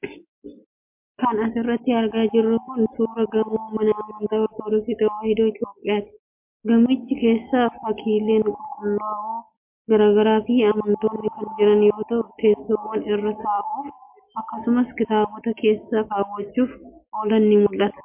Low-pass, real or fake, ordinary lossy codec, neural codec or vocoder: 3.6 kHz; real; MP3, 32 kbps; none